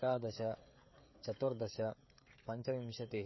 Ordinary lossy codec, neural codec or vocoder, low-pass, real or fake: MP3, 24 kbps; codec, 16 kHz, 16 kbps, FreqCodec, smaller model; 7.2 kHz; fake